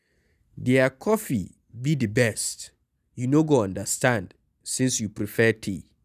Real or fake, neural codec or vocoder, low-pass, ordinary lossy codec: real; none; 14.4 kHz; none